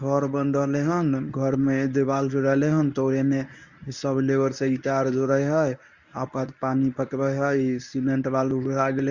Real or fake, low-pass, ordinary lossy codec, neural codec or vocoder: fake; 7.2 kHz; Opus, 64 kbps; codec, 24 kHz, 0.9 kbps, WavTokenizer, medium speech release version 1